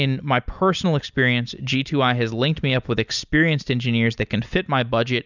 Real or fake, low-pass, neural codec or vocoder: real; 7.2 kHz; none